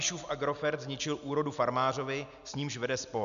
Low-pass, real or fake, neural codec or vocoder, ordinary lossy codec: 7.2 kHz; real; none; AAC, 96 kbps